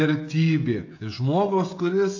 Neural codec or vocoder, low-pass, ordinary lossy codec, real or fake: codec, 16 kHz, 16 kbps, FreqCodec, smaller model; 7.2 kHz; AAC, 48 kbps; fake